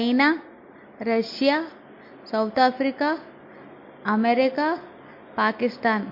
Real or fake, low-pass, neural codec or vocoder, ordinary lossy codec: real; 5.4 kHz; none; MP3, 32 kbps